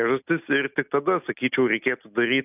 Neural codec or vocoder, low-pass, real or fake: none; 3.6 kHz; real